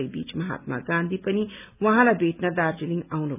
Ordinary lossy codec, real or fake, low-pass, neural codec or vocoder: none; real; 3.6 kHz; none